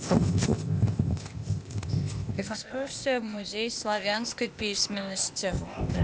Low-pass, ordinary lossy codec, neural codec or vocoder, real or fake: none; none; codec, 16 kHz, 0.8 kbps, ZipCodec; fake